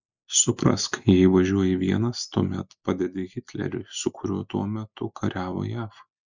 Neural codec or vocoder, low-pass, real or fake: none; 7.2 kHz; real